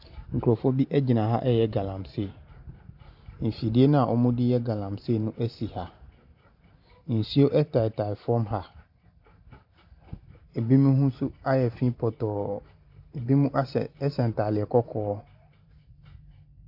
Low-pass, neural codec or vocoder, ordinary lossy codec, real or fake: 5.4 kHz; vocoder, 44.1 kHz, 128 mel bands every 512 samples, BigVGAN v2; AAC, 48 kbps; fake